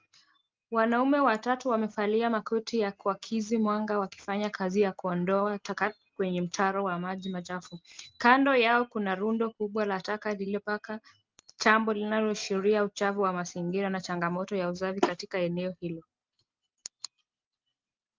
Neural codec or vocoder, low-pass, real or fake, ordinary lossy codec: none; 7.2 kHz; real; Opus, 32 kbps